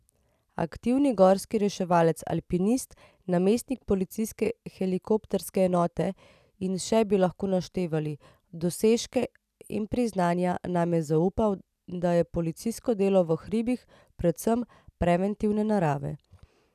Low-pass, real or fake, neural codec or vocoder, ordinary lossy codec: 14.4 kHz; real; none; none